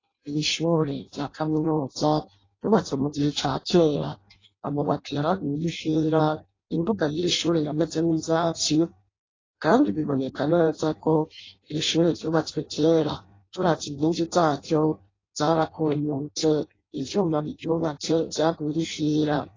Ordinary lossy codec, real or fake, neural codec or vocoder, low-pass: AAC, 32 kbps; fake; codec, 16 kHz in and 24 kHz out, 0.6 kbps, FireRedTTS-2 codec; 7.2 kHz